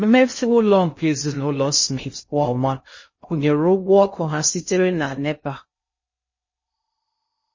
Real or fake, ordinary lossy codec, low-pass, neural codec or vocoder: fake; MP3, 32 kbps; 7.2 kHz; codec, 16 kHz in and 24 kHz out, 0.6 kbps, FocalCodec, streaming, 2048 codes